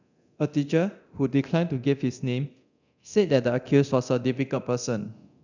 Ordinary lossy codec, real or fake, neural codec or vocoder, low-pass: none; fake; codec, 24 kHz, 0.9 kbps, DualCodec; 7.2 kHz